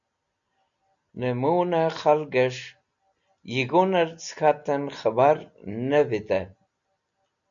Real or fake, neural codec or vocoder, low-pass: real; none; 7.2 kHz